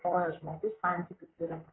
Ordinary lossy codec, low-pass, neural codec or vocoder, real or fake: AAC, 16 kbps; 7.2 kHz; vocoder, 44.1 kHz, 128 mel bands, Pupu-Vocoder; fake